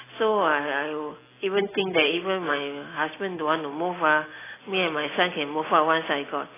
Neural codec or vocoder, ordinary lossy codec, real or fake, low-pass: none; AAC, 16 kbps; real; 3.6 kHz